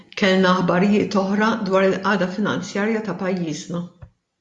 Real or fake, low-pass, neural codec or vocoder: real; 10.8 kHz; none